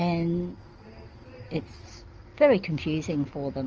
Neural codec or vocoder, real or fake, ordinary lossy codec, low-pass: none; real; Opus, 24 kbps; 7.2 kHz